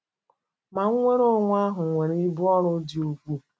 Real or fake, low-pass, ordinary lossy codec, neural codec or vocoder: real; none; none; none